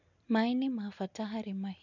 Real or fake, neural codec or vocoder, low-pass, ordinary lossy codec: real; none; 7.2 kHz; none